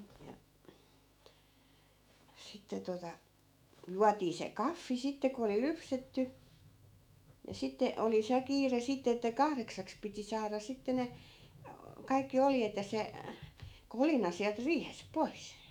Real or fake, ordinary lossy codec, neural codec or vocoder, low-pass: fake; none; autoencoder, 48 kHz, 128 numbers a frame, DAC-VAE, trained on Japanese speech; 19.8 kHz